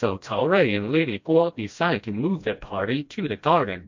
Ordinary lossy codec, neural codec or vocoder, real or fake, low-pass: MP3, 48 kbps; codec, 16 kHz, 1 kbps, FreqCodec, smaller model; fake; 7.2 kHz